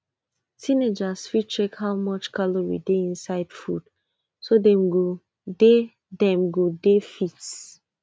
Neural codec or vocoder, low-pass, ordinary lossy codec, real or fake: none; none; none; real